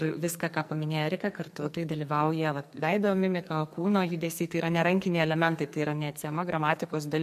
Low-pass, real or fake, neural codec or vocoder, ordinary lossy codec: 14.4 kHz; fake; codec, 44.1 kHz, 2.6 kbps, SNAC; MP3, 64 kbps